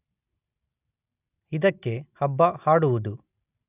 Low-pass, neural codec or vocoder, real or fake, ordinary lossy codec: 3.6 kHz; none; real; none